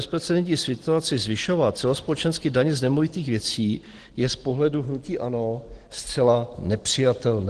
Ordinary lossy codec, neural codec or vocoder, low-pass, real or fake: Opus, 16 kbps; none; 9.9 kHz; real